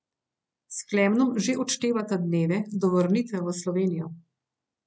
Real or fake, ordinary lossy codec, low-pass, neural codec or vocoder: real; none; none; none